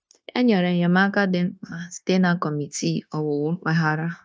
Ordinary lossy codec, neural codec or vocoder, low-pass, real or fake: none; codec, 16 kHz, 0.9 kbps, LongCat-Audio-Codec; none; fake